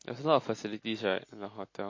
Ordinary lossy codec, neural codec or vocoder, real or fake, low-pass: MP3, 32 kbps; none; real; 7.2 kHz